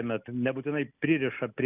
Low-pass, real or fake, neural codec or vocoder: 3.6 kHz; real; none